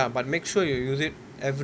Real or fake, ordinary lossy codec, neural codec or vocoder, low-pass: real; none; none; none